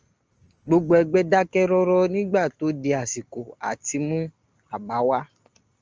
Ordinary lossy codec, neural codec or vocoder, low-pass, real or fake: Opus, 24 kbps; none; 7.2 kHz; real